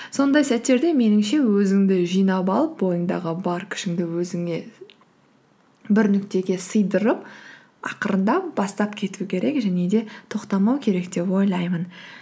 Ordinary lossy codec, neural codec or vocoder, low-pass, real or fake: none; none; none; real